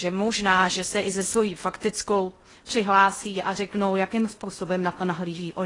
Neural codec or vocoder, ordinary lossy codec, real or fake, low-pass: codec, 16 kHz in and 24 kHz out, 0.6 kbps, FocalCodec, streaming, 2048 codes; AAC, 32 kbps; fake; 10.8 kHz